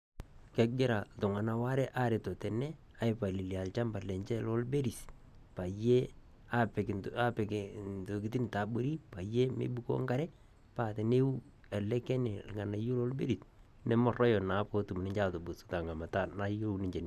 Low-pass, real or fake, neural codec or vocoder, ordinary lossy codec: 14.4 kHz; real; none; none